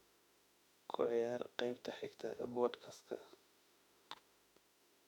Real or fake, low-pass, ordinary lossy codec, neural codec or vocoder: fake; 19.8 kHz; none; autoencoder, 48 kHz, 32 numbers a frame, DAC-VAE, trained on Japanese speech